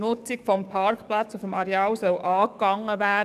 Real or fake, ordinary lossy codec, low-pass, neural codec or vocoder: fake; none; 14.4 kHz; codec, 44.1 kHz, 7.8 kbps, DAC